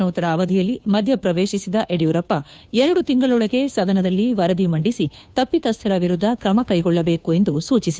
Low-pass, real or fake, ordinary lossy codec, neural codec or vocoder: none; fake; none; codec, 16 kHz, 2 kbps, FunCodec, trained on Chinese and English, 25 frames a second